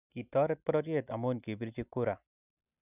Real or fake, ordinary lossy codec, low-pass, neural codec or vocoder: real; none; 3.6 kHz; none